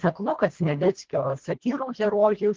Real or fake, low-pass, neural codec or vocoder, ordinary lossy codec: fake; 7.2 kHz; codec, 24 kHz, 1.5 kbps, HILCodec; Opus, 16 kbps